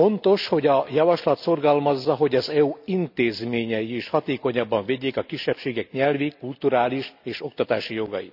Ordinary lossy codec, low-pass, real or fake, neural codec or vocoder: none; 5.4 kHz; real; none